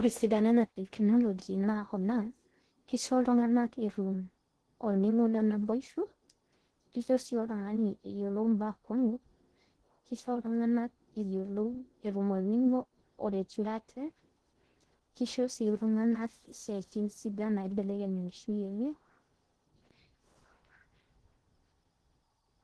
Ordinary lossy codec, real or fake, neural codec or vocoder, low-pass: Opus, 16 kbps; fake; codec, 16 kHz in and 24 kHz out, 0.6 kbps, FocalCodec, streaming, 2048 codes; 10.8 kHz